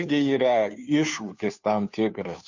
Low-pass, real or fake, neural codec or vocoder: 7.2 kHz; fake; codec, 16 kHz, 2 kbps, FunCodec, trained on Chinese and English, 25 frames a second